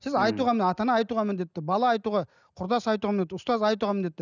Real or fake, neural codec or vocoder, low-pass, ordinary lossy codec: real; none; 7.2 kHz; none